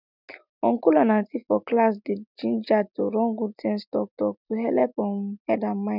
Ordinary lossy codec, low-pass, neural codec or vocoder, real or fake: none; 5.4 kHz; none; real